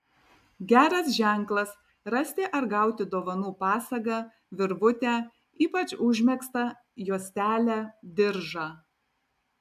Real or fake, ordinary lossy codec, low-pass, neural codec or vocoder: real; AAC, 96 kbps; 14.4 kHz; none